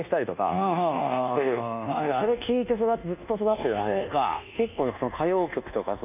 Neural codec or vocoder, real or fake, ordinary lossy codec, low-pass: codec, 24 kHz, 1.2 kbps, DualCodec; fake; none; 3.6 kHz